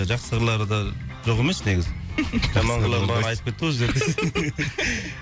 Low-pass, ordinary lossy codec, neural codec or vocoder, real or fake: none; none; none; real